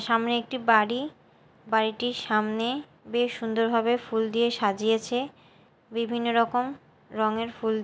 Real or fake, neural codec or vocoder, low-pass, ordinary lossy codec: real; none; none; none